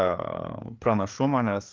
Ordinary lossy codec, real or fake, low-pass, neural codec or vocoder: Opus, 32 kbps; fake; 7.2 kHz; codec, 16 kHz, 4 kbps, FreqCodec, larger model